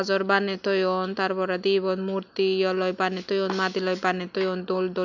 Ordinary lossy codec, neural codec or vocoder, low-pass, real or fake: none; none; 7.2 kHz; real